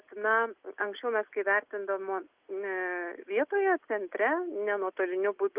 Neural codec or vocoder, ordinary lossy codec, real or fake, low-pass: none; Opus, 32 kbps; real; 3.6 kHz